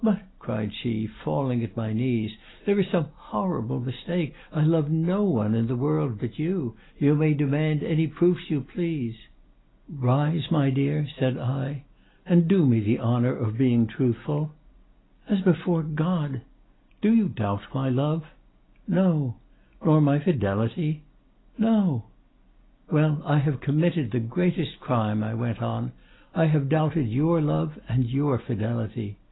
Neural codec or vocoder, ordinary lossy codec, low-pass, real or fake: none; AAC, 16 kbps; 7.2 kHz; real